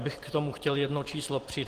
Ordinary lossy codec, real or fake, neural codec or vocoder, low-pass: Opus, 16 kbps; real; none; 14.4 kHz